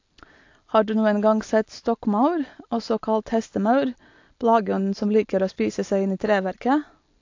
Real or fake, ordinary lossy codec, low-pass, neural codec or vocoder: real; AAC, 48 kbps; 7.2 kHz; none